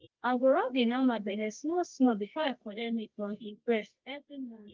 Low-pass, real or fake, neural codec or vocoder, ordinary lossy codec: 7.2 kHz; fake; codec, 24 kHz, 0.9 kbps, WavTokenizer, medium music audio release; Opus, 32 kbps